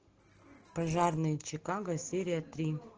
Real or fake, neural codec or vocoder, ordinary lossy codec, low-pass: real; none; Opus, 16 kbps; 7.2 kHz